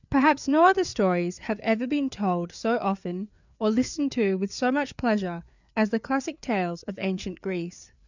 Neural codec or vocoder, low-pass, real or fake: codec, 16 kHz, 4 kbps, FreqCodec, larger model; 7.2 kHz; fake